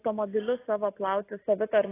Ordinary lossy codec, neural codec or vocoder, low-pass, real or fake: AAC, 16 kbps; none; 3.6 kHz; real